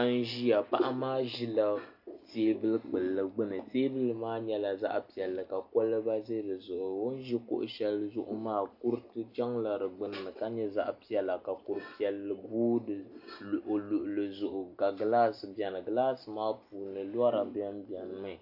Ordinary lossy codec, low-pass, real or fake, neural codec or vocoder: AAC, 48 kbps; 5.4 kHz; real; none